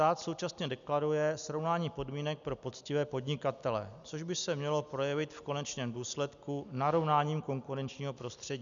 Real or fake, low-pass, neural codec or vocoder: real; 7.2 kHz; none